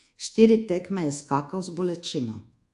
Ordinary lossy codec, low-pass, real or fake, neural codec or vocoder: AAC, 64 kbps; 10.8 kHz; fake; codec, 24 kHz, 1.2 kbps, DualCodec